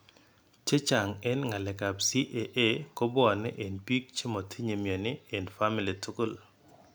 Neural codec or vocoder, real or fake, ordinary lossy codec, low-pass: none; real; none; none